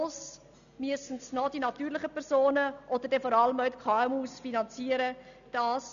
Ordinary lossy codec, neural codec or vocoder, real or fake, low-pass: none; none; real; 7.2 kHz